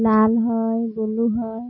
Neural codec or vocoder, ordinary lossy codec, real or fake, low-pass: none; MP3, 24 kbps; real; 7.2 kHz